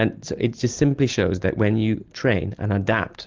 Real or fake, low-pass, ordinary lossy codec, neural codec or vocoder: real; 7.2 kHz; Opus, 32 kbps; none